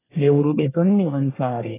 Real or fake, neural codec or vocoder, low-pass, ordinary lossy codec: fake; codec, 24 kHz, 1 kbps, SNAC; 3.6 kHz; AAC, 24 kbps